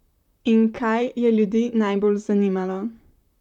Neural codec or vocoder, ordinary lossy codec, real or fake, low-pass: vocoder, 44.1 kHz, 128 mel bands, Pupu-Vocoder; none; fake; 19.8 kHz